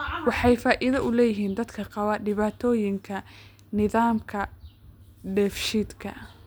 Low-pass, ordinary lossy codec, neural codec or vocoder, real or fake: none; none; none; real